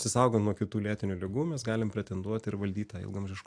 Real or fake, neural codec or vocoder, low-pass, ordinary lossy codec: real; none; 9.9 kHz; AAC, 64 kbps